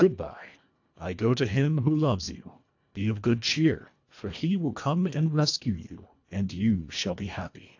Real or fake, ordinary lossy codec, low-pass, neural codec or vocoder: fake; AAC, 48 kbps; 7.2 kHz; codec, 24 kHz, 1.5 kbps, HILCodec